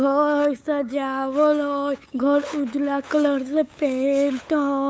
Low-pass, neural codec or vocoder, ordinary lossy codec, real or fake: none; codec, 16 kHz, 8 kbps, FunCodec, trained on LibriTTS, 25 frames a second; none; fake